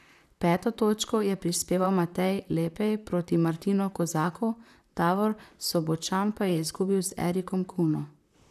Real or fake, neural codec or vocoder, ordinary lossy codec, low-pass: fake; vocoder, 44.1 kHz, 128 mel bands, Pupu-Vocoder; none; 14.4 kHz